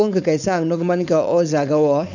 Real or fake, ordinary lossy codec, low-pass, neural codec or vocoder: fake; none; 7.2 kHz; codec, 16 kHz, 4.8 kbps, FACodec